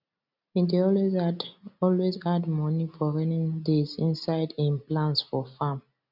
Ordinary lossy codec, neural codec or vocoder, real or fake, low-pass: none; none; real; 5.4 kHz